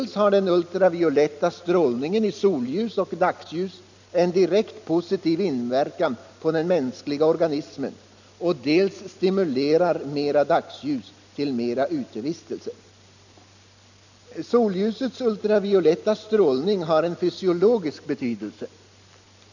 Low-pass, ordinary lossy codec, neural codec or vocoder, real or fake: 7.2 kHz; none; none; real